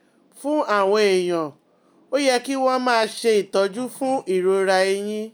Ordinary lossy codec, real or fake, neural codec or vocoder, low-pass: none; real; none; none